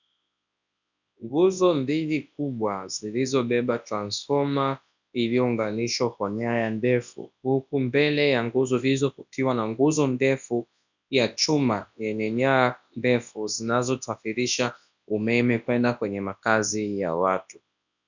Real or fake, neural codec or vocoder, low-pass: fake; codec, 24 kHz, 0.9 kbps, WavTokenizer, large speech release; 7.2 kHz